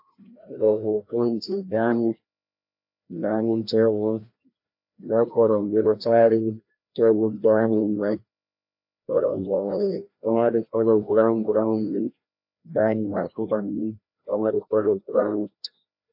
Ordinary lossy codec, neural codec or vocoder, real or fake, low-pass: AAC, 48 kbps; codec, 16 kHz, 1 kbps, FreqCodec, larger model; fake; 5.4 kHz